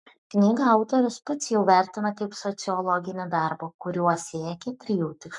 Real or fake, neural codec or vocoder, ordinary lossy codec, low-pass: fake; codec, 44.1 kHz, 7.8 kbps, Pupu-Codec; MP3, 96 kbps; 10.8 kHz